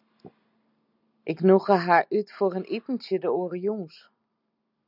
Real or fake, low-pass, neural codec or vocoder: real; 5.4 kHz; none